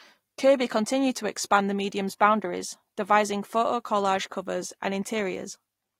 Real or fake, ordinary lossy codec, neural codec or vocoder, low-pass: real; AAC, 48 kbps; none; 19.8 kHz